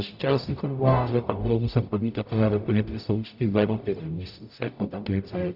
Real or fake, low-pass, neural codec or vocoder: fake; 5.4 kHz; codec, 44.1 kHz, 0.9 kbps, DAC